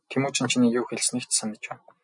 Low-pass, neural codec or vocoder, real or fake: 10.8 kHz; none; real